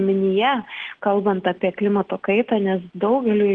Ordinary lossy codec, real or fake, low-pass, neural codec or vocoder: Opus, 16 kbps; real; 9.9 kHz; none